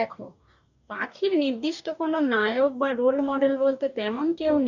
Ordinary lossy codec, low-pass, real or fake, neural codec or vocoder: none; 7.2 kHz; fake; codec, 44.1 kHz, 2.6 kbps, DAC